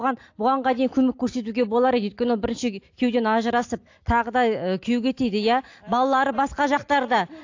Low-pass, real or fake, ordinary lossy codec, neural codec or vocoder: 7.2 kHz; real; AAC, 48 kbps; none